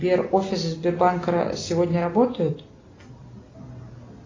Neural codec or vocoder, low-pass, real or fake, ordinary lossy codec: none; 7.2 kHz; real; AAC, 32 kbps